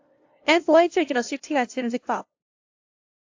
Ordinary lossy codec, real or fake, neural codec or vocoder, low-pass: AAC, 48 kbps; fake; codec, 16 kHz, 0.5 kbps, FunCodec, trained on LibriTTS, 25 frames a second; 7.2 kHz